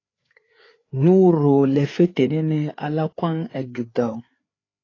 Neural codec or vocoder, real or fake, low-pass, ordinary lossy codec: codec, 16 kHz, 4 kbps, FreqCodec, larger model; fake; 7.2 kHz; AAC, 32 kbps